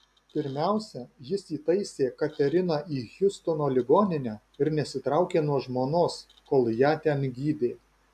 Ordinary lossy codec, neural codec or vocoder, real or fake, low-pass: AAC, 96 kbps; none; real; 14.4 kHz